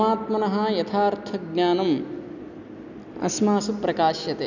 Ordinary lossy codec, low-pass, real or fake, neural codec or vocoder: none; 7.2 kHz; real; none